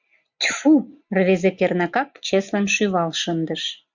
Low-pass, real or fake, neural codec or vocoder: 7.2 kHz; real; none